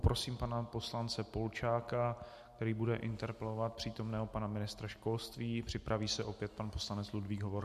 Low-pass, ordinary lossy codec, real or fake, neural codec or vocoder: 14.4 kHz; MP3, 64 kbps; real; none